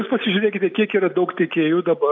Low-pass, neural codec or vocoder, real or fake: 7.2 kHz; none; real